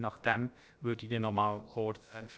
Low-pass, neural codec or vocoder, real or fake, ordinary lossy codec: none; codec, 16 kHz, about 1 kbps, DyCAST, with the encoder's durations; fake; none